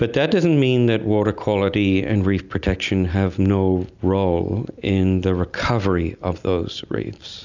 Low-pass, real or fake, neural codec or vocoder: 7.2 kHz; real; none